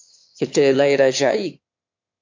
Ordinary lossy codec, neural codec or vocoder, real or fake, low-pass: MP3, 64 kbps; autoencoder, 22.05 kHz, a latent of 192 numbers a frame, VITS, trained on one speaker; fake; 7.2 kHz